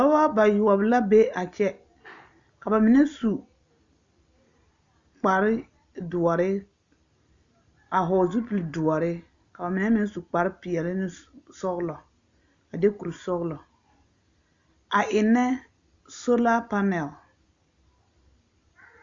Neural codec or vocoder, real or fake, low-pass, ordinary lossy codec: none; real; 7.2 kHz; Opus, 64 kbps